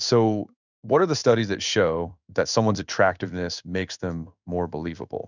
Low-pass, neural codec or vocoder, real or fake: 7.2 kHz; codec, 16 kHz in and 24 kHz out, 1 kbps, XY-Tokenizer; fake